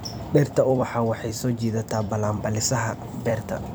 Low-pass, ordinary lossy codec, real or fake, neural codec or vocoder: none; none; fake; vocoder, 44.1 kHz, 128 mel bands every 256 samples, BigVGAN v2